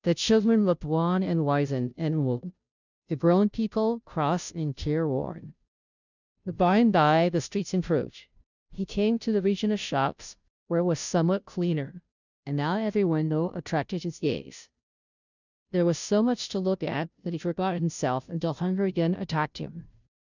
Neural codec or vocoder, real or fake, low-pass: codec, 16 kHz, 0.5 kbps, FunCodec, trained on Chinese and English, 25 frames a second; fake; 7.2 kHz